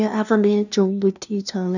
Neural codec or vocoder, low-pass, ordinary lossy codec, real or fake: codec, 16 kHz, 0.5 kbps, FunCodec, trained on LibriTTS, 25 frames a second; 7.2 kHz; none; fake